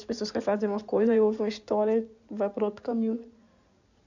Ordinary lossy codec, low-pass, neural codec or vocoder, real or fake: none; 7.2 kHz; codec, 16 kHz in and 24 kHz out, 2.2 kbps, FireRedTTS-2 codec; fake